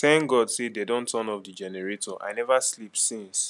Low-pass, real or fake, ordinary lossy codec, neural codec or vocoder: 10.8 kHz; real; none; none